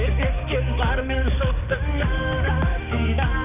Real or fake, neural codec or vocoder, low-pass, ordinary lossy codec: fake; codec, 16 kHz, 4 kbps, X-Codec, HuBERT features, trained on balanced general audio; 3.6 kHz; none